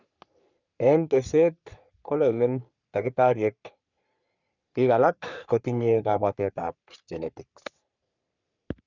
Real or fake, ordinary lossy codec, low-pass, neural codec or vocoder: fake; none; 7.2 kHz; codec, 44.1 kHz, 3.4 kbps, Pupu-Codec